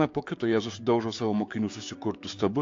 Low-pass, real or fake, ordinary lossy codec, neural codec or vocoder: 7.2 kHz; fake; AAC, 48 kbps; codec, 16 kHz, 6 kbps, DAC